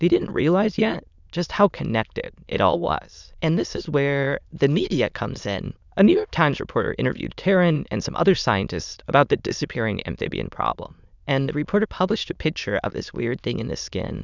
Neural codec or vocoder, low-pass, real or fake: autoencoder, 22.05 kHz, a latent of 192 numbers a frame, VITS, trained on many speakers; 7.2 kHz; fake